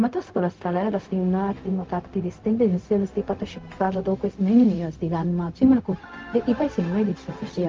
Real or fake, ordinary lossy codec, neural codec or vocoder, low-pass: fake; Opus, 32 kbps; codec, 16 kHz, 0.4 kbps, LongCat-Audio-Codec; 7.2 kHz